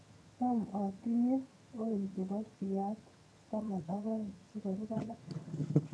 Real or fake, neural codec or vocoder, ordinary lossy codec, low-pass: fake; vocoder, 22.05 kHz, 80 mel bands, HiFi-GAN; none; none